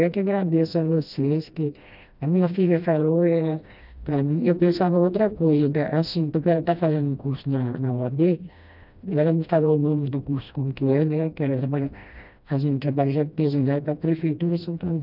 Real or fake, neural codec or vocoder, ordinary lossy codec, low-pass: fake; codec, 16 kHz, 1 kbps, FreqCodec, smaller model; none; 5.4 kHz